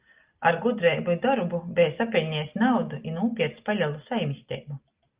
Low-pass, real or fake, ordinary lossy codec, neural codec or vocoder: 3.6 kHz; real; Opus, 32 kbps; none